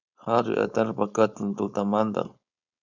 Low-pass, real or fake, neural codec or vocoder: 7.2 kHz; fake; codec, 16 kHz, 4.8 kbps, FACodec